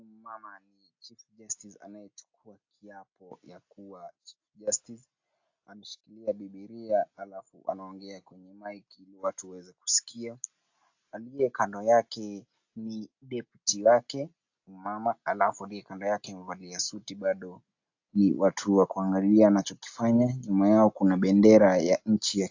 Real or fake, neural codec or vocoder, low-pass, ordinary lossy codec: real; none; 7.2 kHz; AAC, 48 kbps